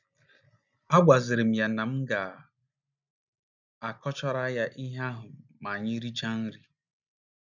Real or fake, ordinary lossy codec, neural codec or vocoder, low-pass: real; none; none; 7.2 kHz